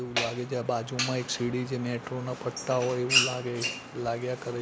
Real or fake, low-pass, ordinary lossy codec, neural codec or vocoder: real; none; none; none